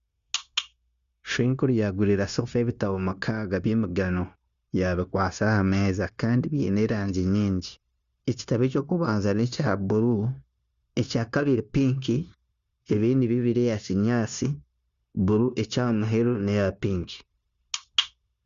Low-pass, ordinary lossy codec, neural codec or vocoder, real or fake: 7.2 kHz; Opus, 64 kbps; codec, 16 kHz, 0.9 kbps, LongCat-Audio-Codec; fake